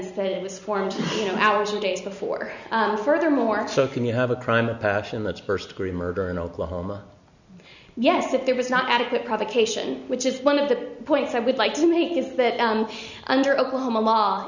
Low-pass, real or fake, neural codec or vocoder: 7.2 kHz; real; none